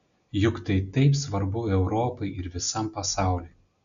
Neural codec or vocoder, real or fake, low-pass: none; real; 7.2 kHz